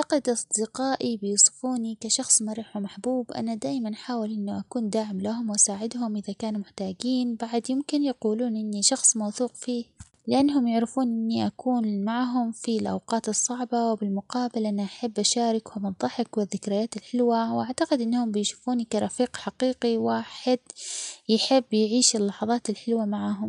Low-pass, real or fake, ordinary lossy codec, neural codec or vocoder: 10.8 kHz; real; none; none